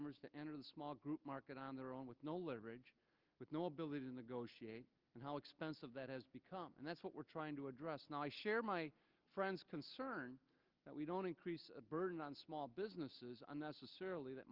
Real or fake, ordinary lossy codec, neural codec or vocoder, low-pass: real; Opus, 32 kbps; none; 5.4 kHz